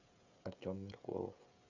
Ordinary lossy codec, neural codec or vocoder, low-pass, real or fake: MP3, 64 kbps; codec, 16 kHz, 16 kbps, FreqCodec, smaller model; 7.2 kHz; fake